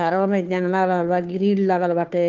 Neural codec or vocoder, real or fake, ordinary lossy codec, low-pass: codec, 16 kHz, 2 kbps, FunCodec, trained on Chinese and English, 25 frames a second; fake; Opus, 32 kbps; 7.2 kHz